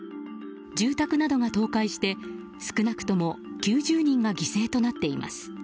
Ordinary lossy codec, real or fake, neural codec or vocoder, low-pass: none; real; none; none